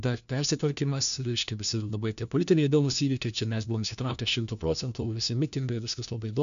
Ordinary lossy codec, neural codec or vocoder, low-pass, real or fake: AAC, 96 kbps; codec, 16 kHz, 1 kbps, FunCodec, trained on LibriTTS, 50 frames a second; 7.2 kHz; fake